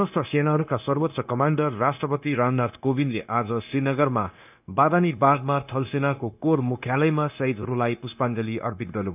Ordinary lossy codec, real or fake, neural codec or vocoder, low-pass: none; fake; codec, 16 kHz, 0.9 kbps, LongCat-Audio-Codec; 3.6 kHz